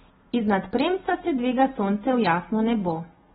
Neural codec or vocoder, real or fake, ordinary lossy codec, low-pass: none; real; AAC, 16 kbps; 7.2 kHz